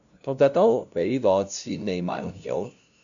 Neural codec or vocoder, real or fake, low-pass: codec, 16 kHz, 0.5 kbps, FunCodec, trained on LibriTTS, 25 frames a second; fake; 7.2 kHz